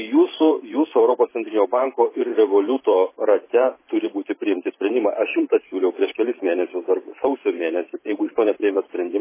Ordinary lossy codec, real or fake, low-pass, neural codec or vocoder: MP3, 16 kbps; fake; 3.6 kHz; vocoder, 44.1 kHz, 128 mel bands every 512 samples, BigVGAN v2